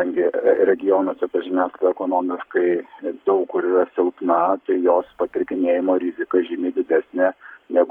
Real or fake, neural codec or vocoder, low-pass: fake; codec, 44.1 kHz, 7.8 kbps, Pupu-Codec; 19.8 kHz